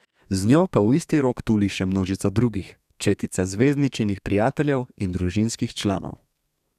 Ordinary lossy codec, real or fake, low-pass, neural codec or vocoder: none; fake; 14.4 kHz; codec, 32 kHz, 1.9 kbps, SNAC